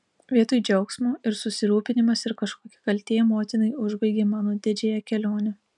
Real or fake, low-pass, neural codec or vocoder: real; 10.8 kHz; none